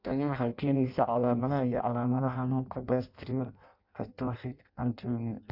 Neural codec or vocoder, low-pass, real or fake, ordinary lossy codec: codec, 16 kHz in and 24 kHz out, 0.6 kbps, FireRedTTS-2 codec; 5.4 kHz; fake; AAC, 48 kbps